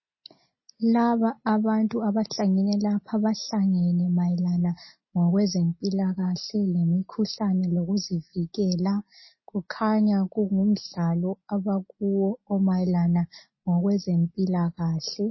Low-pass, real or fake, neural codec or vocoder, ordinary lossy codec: 7.2 kHz; real; none; MP3, 24 kbps